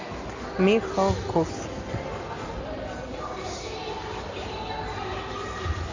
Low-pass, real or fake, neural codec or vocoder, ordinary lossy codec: 7.2 kHz; real; none; AAC, 48 kbps